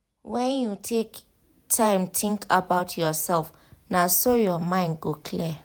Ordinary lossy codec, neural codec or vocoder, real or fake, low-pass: none; vocoder, 48 kHz, 128 mel bands, Vocos; fake; none